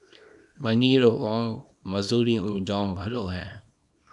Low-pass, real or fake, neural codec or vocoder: 10.8 kHz; fake; codec, 24 kHz, 0.9 kbps, WavTokenizer, small release